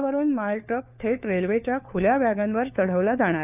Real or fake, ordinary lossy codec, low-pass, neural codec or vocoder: fake; none; 3.6 kHz; codec, 16 kHz, 4 kbps, FunCodec, trained on LibriTTS, 50 frames a second